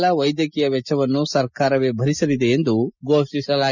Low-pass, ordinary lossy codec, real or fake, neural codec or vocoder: 7.2 kHz; none; real; none